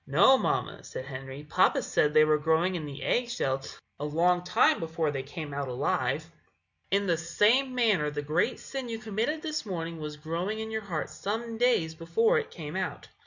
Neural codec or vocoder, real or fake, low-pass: none; real; 7.2 kHz